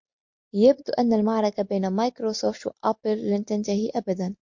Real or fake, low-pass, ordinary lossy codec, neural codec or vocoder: real; 7.2 kHz; MP3, 48 kbps; none